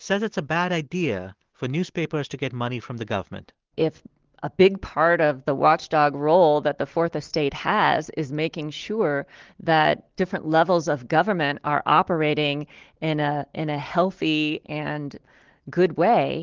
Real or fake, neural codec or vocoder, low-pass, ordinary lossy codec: real; none; 7.2 kHz; Opus, 16 kbps